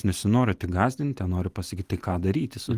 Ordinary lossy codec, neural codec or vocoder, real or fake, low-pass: Opus, 24 kbps; none; real; 14.4 kHz